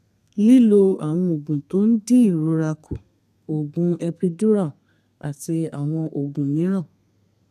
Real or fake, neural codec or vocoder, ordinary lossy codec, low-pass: fake; codec, 32 kHz, 1.9 kbps, SNAC; none; 14.4 kHz